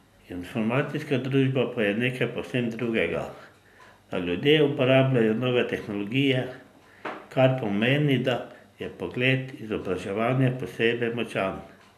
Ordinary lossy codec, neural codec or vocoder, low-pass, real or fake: none; none; 14.4 kHz; real